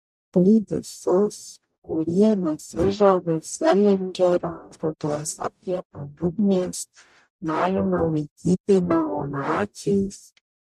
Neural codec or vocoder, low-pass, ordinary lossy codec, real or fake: codec, 44.1 kHz, 0.9 kbps, DAC; 14.4 kHz; MP3, 64 kbps; fake